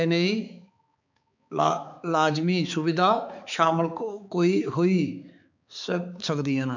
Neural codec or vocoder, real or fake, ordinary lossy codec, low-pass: codec, 16 kHz, 4 kbps, X-Codec, HuBERT features, trained on balanced general audio; fake; none; 7.2 kHz